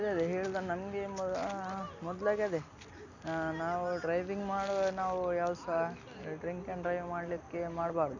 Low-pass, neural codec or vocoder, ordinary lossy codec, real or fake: 7.2 kHz; none; none; real